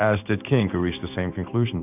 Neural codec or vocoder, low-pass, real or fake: codec, 16 kHz in and 24 kHz out, 1 kbps, XY-Tokenizer; 3.6 kHz; fake